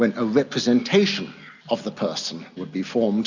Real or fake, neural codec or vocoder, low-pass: real; none; 7.2 kHz